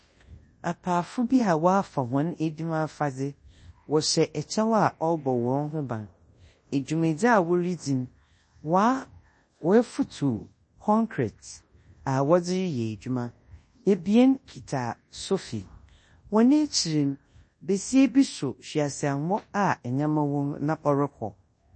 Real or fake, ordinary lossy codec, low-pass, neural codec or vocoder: fake; MP3, 32 kbps; 9.9 kHz; codec, 24 kHz, 0.9 kbps, WavTokenizer, large speech release